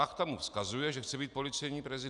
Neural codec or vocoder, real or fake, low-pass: none; real; 10.8 kHz